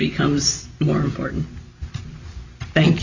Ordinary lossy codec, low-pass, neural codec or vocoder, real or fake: Opus, 64 kbps; 7.2 kHz; none; real